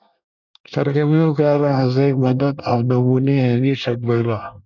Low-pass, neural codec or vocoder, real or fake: 7.2 kHz; codec, 24 kHz, 1 kbps, SNAC; fake